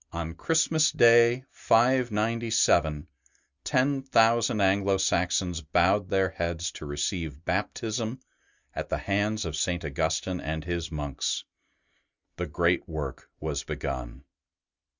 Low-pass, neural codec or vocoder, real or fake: 7.2 kHz; none; real